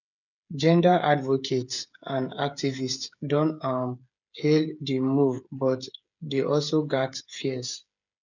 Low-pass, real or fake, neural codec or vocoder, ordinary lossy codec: 7.2 kHz; fake; codec, 16 kHz, 8 kbps, FreqCodec, smaller model; none